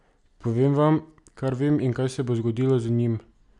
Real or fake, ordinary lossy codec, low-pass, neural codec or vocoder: real; none; 10.8 kHz; none